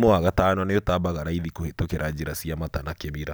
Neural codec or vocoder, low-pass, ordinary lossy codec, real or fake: none; none; none; real